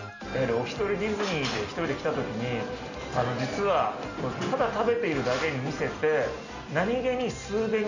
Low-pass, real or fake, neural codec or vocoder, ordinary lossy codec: 7.2 kHz; real; none; none